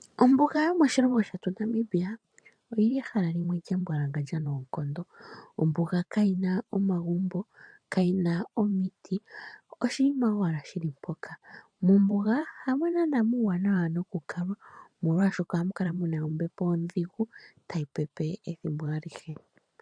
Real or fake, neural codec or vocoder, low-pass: fake; vocoder, 44.1 kHz, 128 mel bands every 512 samples, BigVGAN v2; 9.9 kHz